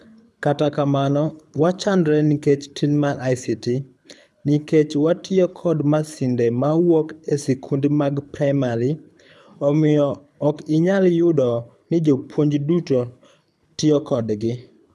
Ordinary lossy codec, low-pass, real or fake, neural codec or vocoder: none; none; fake; codec, 24 kHz, 6 kbps, HILCodec